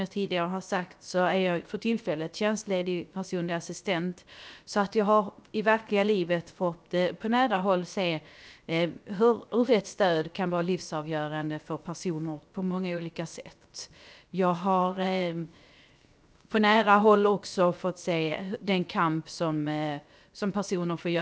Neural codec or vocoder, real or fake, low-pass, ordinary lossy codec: codec, 16 kHz, 0.7 kbps, FocalCodec; fake; none; none